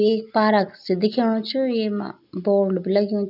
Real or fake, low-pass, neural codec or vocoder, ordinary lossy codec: real; 5.4 kHz; none; none